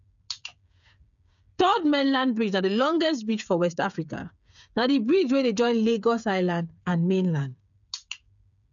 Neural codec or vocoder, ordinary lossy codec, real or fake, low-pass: codec, 16 kHz, 8 kbps, FreqCodec, smaller model; none; fake; 7.2 kHz